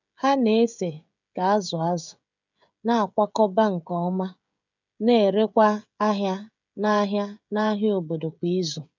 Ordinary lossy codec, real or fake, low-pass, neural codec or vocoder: none; fake; 7.2 kHz; codec, 16 kHz, 16 kbps, FreqCodec, smaller model